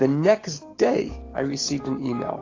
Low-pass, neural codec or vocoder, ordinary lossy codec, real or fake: 7.2 kHz; codec, 16 kHz, 6 kbps, DAC; AAC, 32 kbps; fake